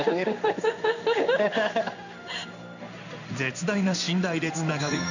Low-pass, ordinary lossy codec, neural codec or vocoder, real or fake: 7.2 kHz; none; codec, 16 kHz in and 24 kHz out, 1 kbps, XY-Tokenizer; fake